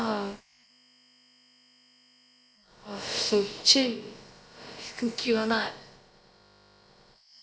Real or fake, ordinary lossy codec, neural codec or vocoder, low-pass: fake; none; codec, 16 kHz, about 1 kbps, DyCAST, with the encoder's durations; none